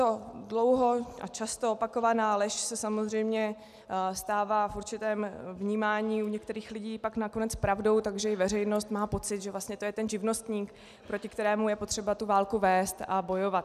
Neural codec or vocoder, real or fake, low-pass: none; real; 14.4 kHz